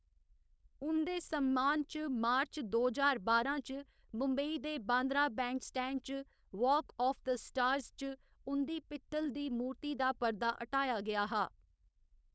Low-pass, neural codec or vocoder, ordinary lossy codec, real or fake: none; codec, 16 kHz, 4.8 kbps, FACodec; none; fake